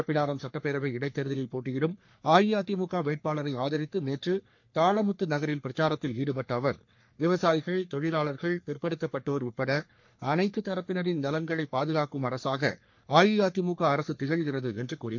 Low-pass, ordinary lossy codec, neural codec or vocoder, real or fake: 7.2 kHz; MP3, 48 kbps; codec, 44.1 kHz, 3.4 kbps, Pupu-Codec; fake